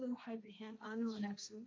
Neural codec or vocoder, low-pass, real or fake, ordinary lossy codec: codec, 16 kHz, 1.1 kbps, Voila-Tokenizer; none; fake; none